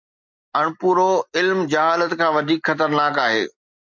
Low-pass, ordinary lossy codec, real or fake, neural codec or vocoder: 7.2 kHz; MP3, 64 kbps; real; none